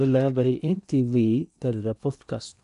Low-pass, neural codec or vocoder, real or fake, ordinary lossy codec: 10.8 kHz; codec, 16 kHz in and 24 kHz out, 0.8 kbps, FocalCodec, streaming, 65536 codes; fake; none